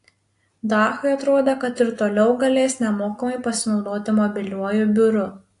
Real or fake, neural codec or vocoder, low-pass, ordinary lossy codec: real; none; 10.8 kHz; AAC, 48 kbps